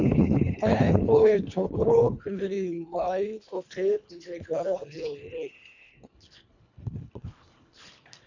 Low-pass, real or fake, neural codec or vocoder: 7.2 kHz; fake; codec, 24 kHz, 1.5 kbps, HILCodec